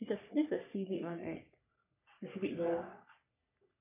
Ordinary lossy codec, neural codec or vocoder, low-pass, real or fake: none; codec, 44.1 kHz, 3.4 kbps, Pupu-Codec; 3.6 kHz; fake